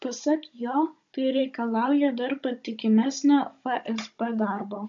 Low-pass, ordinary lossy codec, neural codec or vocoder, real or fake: 7.2 kHz; MP3, 48 kbps; codec, 16 kHz, 16 kbps, FunCodec, trained on Chinese and English, 50 frames a second; fake